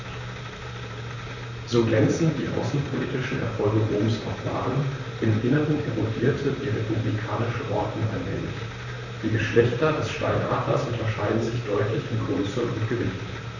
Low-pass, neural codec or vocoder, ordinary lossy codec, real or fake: 7.2 kHz; vocoder, 44.1 kHz, 128 mel bands, Pupu-Vocoder; none; fake